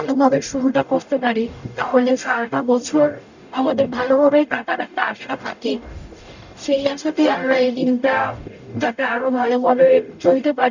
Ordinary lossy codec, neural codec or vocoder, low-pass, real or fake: none; codec, 44.1 kHz, 0.9 kbps, DAC; 7.2 kHz; fake